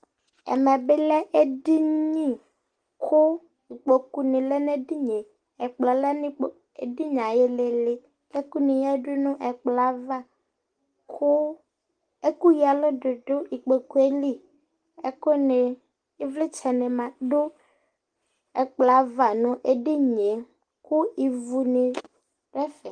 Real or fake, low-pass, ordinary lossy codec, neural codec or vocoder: real; 9.9 kHz; Opus, 24 kbps; none